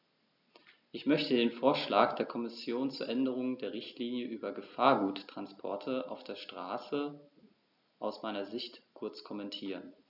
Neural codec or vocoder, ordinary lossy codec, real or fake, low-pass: none; none; real; 5.4 kHz